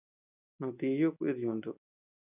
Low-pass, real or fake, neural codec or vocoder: 3.6 kHz; real; none